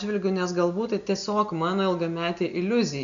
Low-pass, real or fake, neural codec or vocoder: 7.2 kHz; real; none